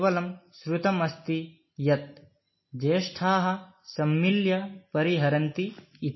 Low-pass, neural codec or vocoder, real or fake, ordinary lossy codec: 7.2 kHz; none; real; MP3, 24 kbps